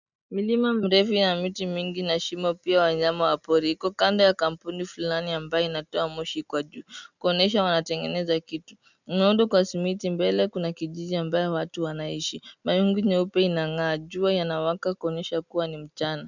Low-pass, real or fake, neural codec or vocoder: 7.2 kHz; real; none